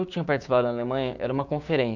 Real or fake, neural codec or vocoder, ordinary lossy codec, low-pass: fake; codec, 16 kHz, 6 kbps, DAC; none; 7.2 kHz